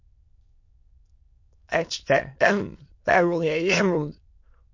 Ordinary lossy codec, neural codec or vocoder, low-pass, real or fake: MP3, 48 kbps; autoencoder, 22.05 kHz, a latent of 192 numbers a frame, VITS, trained on many speakers; 7.2 kHz; fake